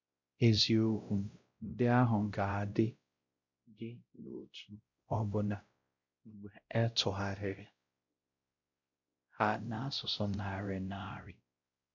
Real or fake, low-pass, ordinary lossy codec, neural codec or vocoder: fake; 7.2 kHz; none; codec, 16 kHz, 0.5 kbps, X-Codec, WavLM features, trained on Multilingual LibriSpeech